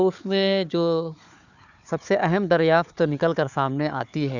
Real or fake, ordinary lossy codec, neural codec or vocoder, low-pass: fake; none; codec, 16 kHz, 4 kbps, FunCodec, trained on Chinese and English, 50 frames a second; 7.2 kHz